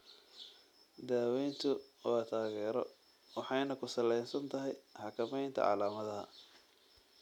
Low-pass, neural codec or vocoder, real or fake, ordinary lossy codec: 19.8 kHz; none; real; none